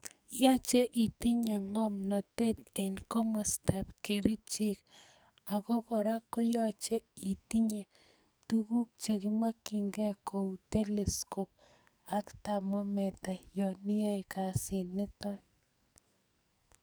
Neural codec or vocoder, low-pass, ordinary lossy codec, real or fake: codec, 44.1 kHz, 2.6 kbps, SNAC; none; none; fake